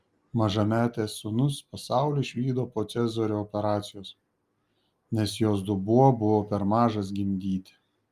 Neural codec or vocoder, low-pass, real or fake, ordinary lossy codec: none; 14.4 kHz; real; Opus, 32 kbps